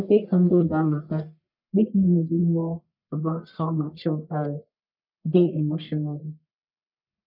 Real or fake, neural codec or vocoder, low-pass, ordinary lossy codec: fake; codec, 44.1 kHz, 1.7 kbps, Pupu-Codec; 5.4 kHz; none